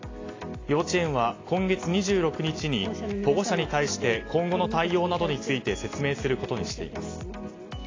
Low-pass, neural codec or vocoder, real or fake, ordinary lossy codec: 7.2 kHz; none; real; AAC, 32 kbps